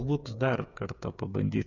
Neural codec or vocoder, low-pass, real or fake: codec, 16 kHz, 8 kbps, FreqCodec, smaller model; 7.2 kHz; fake